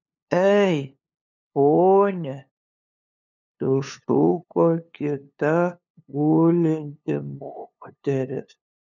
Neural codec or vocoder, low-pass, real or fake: codec, 16 kHz, 2 kbps, FunCodec, trained on LibriTTS, 25 frames a second; 7.2 kHz; fake